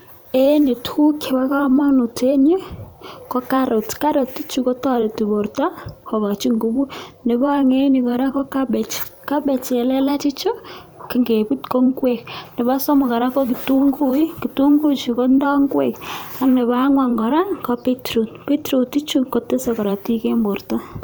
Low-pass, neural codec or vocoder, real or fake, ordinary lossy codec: none; vocoder, 44.1 kHz, 128 mel bands every 512 samples, BigVGAN v2; fake; none